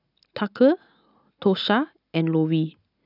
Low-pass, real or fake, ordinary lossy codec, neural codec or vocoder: 5.4 kHz; real; none; none